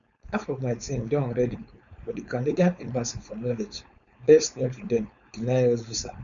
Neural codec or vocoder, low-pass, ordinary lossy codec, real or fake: codec, 16 kHz, 4.8 kbps, FACodec; 7.2 kHz; none; fake